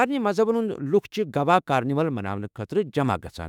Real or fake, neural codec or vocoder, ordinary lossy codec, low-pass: fake; autoencoder, 48 kHz, 32 numbers a frame, DAC-VAE, trained on Japanese speech; none; 19.8 kHz